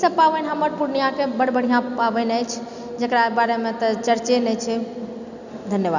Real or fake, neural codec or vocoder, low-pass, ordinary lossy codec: real; none; 7.2 kHz; none